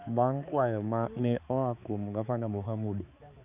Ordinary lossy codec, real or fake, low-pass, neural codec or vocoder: AAC, 32 kbps; fake; 3.6 kHz; codec, 16 kHz, 4 kbps, X-Codec, HuBERT features, trained on balanced general audio